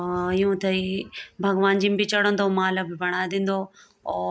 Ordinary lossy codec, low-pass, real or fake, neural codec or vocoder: none; none; real; none